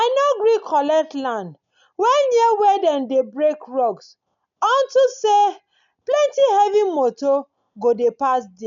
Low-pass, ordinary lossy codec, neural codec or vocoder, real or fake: 7.2 kHz; none; none; real